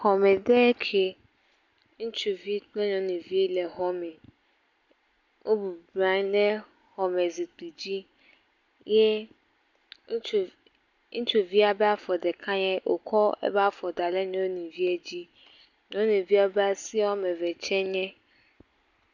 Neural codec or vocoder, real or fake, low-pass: none; real; 7.2 kHz